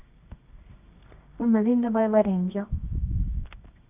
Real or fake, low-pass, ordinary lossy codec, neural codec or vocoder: fake; 3.6 kHz; none; codec, 24 kHz, 0.9 kbps, WavTokenizer, medium music audio release